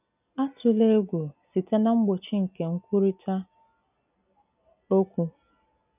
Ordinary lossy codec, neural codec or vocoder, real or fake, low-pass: none; none; real; 3.6 kHz